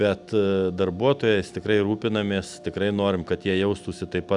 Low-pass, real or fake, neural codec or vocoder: 10.8 kHz; real; none